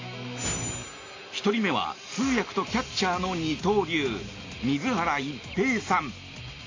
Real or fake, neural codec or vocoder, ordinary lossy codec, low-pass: real; none; AAC, 32 kbps; 7.2 kHz